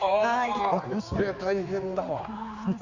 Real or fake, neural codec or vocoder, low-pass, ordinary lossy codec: fake; codec, 16 kHz, 4 kbps, X-Codec, HuBERT features, trained on general audio; 7.2 kHz; Opus, 64 kbps